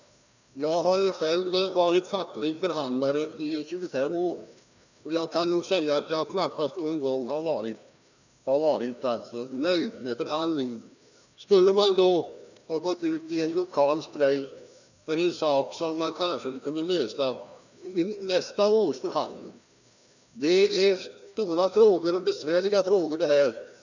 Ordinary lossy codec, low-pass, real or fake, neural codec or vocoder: none; 7.2 kHz; fake; codec, 16 kHz, 1 kbps, FreqCodec, larger model